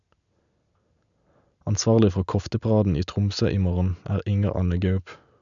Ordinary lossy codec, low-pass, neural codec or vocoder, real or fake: none; 7.2 kHz; none; real